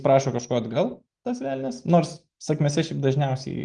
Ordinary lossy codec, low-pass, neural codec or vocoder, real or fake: Opus, 24 kbps; 9.9 kHz; none; real